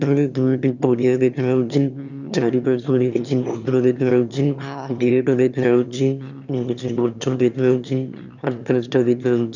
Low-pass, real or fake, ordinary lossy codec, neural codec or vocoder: 7.2 kHz; fake; none; autoencoder, 22.05 kHz, a latent of 192 numbers a frame, VITS, trained on one speaker